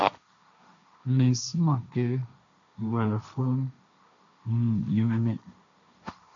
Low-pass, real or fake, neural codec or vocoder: 7.2 kHz; fake; codec, 16 kHz, 1.1 kbps, Voila-Tokenizer